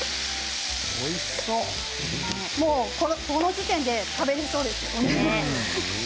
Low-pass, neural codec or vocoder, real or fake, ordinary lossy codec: none; none; real; none